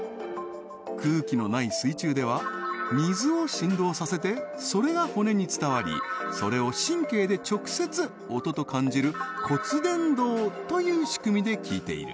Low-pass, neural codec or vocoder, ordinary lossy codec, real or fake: none; none; none; real